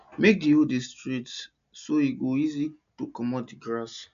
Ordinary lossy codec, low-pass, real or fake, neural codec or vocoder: AAC, 96 kbps; 7.2 kHz; real; none